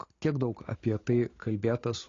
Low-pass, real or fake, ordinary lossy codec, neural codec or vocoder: 7.2 kHz; real; AAC, 32 kbps; none